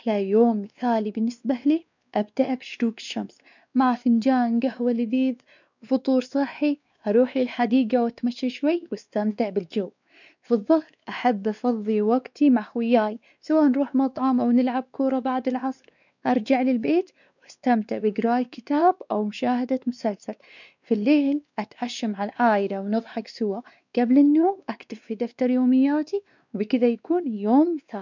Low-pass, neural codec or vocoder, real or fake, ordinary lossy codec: 7.2 kHz; codec, 16 kHz, 2 kbps, X-Codec, WavLM features, trained on Multilingual LibriSpeech; fake; none